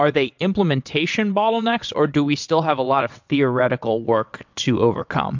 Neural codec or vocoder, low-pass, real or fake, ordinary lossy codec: vocoder, 22.05 kHz, 80 mel bands, WaveNeXt; 7.2 kHz; fake; MP3, 64 kbps